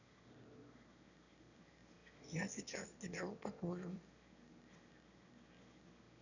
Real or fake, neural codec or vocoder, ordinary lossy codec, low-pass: fake; autoencoder, 22.05 kHz, a latent of 192 numbers a frame, VITS, trained on one speaker; none; 7.2 kHz